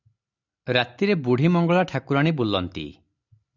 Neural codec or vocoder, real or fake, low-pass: none; real; 7.2 kHz